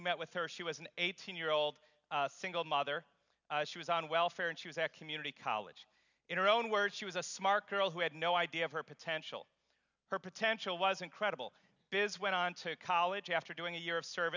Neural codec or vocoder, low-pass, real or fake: none; 7.2 kHz; real